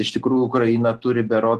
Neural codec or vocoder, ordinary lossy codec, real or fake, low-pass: none; Opus, 16 kbps; real; 14.4 kHz